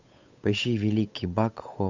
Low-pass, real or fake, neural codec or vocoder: 7.2 kHz; fake; codec, 16 kHz, 8 kbps, FunCodec, trained on Chinese and English, 25 frames a second